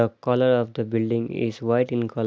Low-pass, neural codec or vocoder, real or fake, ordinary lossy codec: none; none; real; none